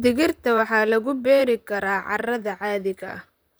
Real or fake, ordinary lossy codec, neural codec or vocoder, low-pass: fake; none; vocoder, 44.1 kHz, 128 mel bands, Pupu-Vocoder; none